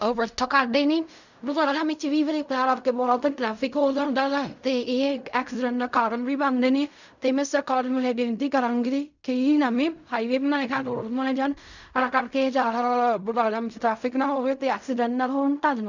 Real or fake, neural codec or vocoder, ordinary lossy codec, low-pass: fake; codec, 16 kHz in and 24 kHz out, 0.4 kbps, LongCat-Audio-Codec, fine tuned four codebook decoder; none; 7.2 kHz